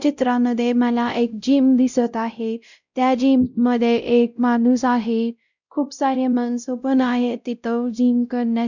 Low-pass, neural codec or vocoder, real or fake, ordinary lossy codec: 7.2 kHz; codec, 16 kHz, 0.5 kbps, X-Codec, WavLM features, trained on Multilingual LibriSpeech; fake; none